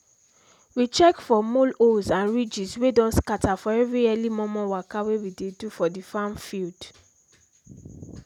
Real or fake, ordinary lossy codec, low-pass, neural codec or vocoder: real; none; 19.8 kHz; none